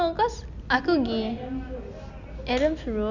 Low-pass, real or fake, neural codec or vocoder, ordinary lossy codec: 7.2 kHz; real; none; none